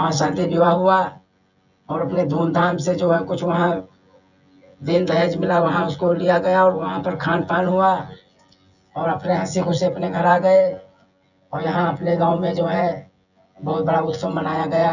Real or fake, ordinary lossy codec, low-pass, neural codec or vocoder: fake; none; 7.2 kHz; vocoder, 24 kHz, 100 mel bands, Vocos